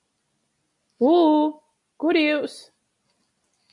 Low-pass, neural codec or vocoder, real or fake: 10.8 kHz; none; real